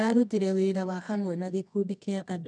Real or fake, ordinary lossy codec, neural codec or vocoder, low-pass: fake; none; codec, 24 kHz, 0.9 kbps, WavTokenizer, medium music audio release; none